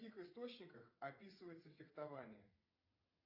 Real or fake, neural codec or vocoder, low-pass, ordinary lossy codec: fake; vocoder, 22.05 kHz, 80 mel bands, WaveNeXt; 5.4 kHz; AAC, 32 kbps